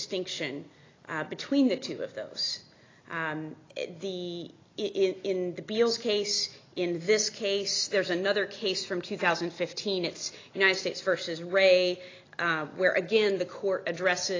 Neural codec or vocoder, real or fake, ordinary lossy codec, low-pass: none; real; AAC, 32 kbps; 7.2 kHz